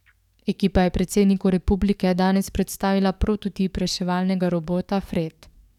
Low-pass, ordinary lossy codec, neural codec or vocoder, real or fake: 19.8 kHz; none; codec, 44.1 kHz, 7.8 kbps, DAC; fake